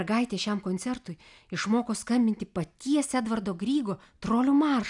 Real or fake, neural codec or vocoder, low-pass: real; none; 10.8 kHz